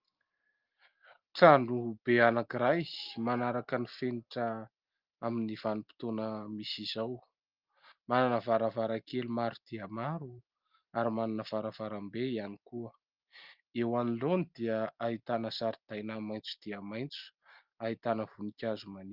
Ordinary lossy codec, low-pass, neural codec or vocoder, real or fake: Opus, 32 kbps; 5.4 kHz; none; real